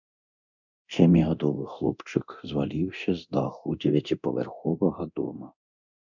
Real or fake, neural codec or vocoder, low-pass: fake; codec, 24 kHz, 0.9 kbps, DualCodec; 7.2 kHz